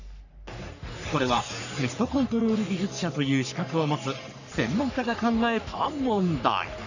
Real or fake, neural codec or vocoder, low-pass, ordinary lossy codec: fake; codec, 44.1 kHz, 3.4 kbps, Pupu-Codec; 7.2 kHz; none